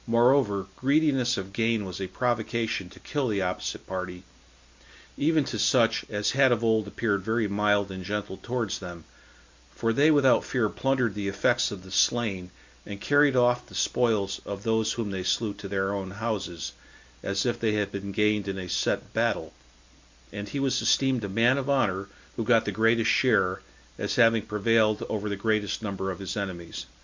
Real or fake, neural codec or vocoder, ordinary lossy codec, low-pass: real; none; MP3, 64 kbps; 7.2 kHz